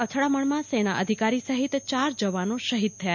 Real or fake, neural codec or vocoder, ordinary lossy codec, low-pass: real; none; none; 7.2 kHz